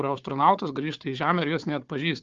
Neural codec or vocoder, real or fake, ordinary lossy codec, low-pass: codec, 16 kHz, 4 kbps, FunCodec, trained on Chinese and English, 50 frames a second; fake; Opus, 32 kbps; 7.2 kHz